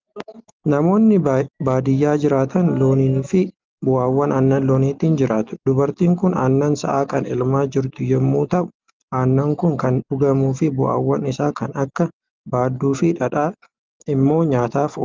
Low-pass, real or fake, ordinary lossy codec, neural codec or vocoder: 7.2 kHz; real; Opus, 32 kbps; none